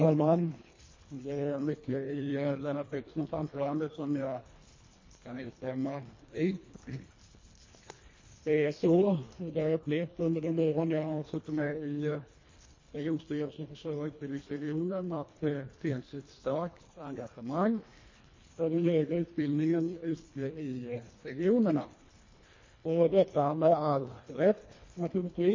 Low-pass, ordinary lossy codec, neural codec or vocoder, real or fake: 7.2 kHz; MP3, 32 kbps; codec, 24 kHz, 1.5 kbps, HILCodec; fake